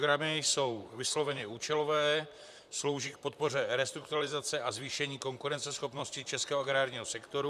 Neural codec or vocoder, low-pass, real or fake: vocoder, 44.1 kHz, 128 mel bands, Pupu-Vocoder; 14.4 kHz; fake